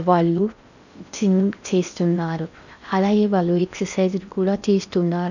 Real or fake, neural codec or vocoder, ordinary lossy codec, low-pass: fake; codec, 16 kHz in and 24 kHz out, 0.6 kbps, FocalCodec, streaming, 4096 codes; none; 7.2 kHz